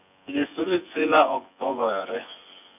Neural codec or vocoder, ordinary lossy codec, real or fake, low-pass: vocoder, 24 kHz, 100 mel bands, Vocos; none; fake; 3.6 kHz